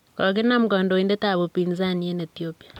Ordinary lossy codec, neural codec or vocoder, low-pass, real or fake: none; vocoder, 44.1 kHz, 128 mel bands every 256 samples, BigVGAN v2; 19.8 kHz; fake